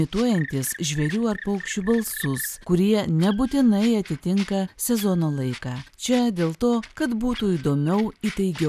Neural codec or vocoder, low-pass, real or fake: none; 14.4 kHz; real